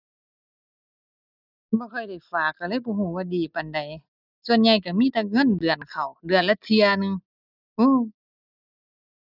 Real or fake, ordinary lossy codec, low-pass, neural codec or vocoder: real; none; 5.4 kHz; none